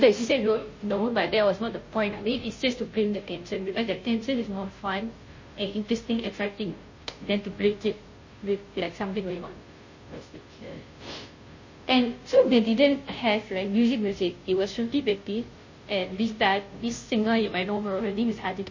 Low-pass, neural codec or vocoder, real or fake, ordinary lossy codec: 7.2 kHz; codec, 16 kHz, 0.5 kbps, FunCodec, trained on Chinese and English, 25 frames a second; fake; MP3, 32 kbps